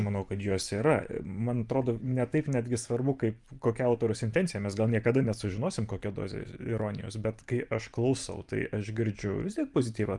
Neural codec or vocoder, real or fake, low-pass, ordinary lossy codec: vocoder, 44.1 kHz, 128 mel bands every 512 samples, BigVGAN v2; fake; 10.8 kHz; Opus, 32 kbps